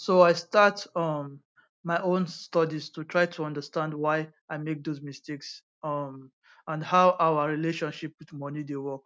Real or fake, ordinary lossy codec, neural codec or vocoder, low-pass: real; none; none; none